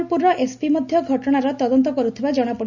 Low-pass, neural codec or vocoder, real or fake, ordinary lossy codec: 7.2 kHz; none; real; Opus, 64 kbps